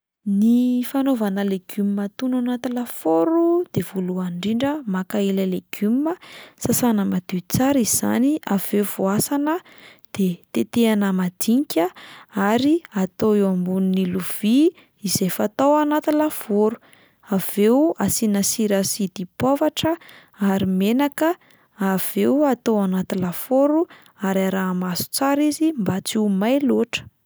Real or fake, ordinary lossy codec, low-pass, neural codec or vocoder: real; none; none; none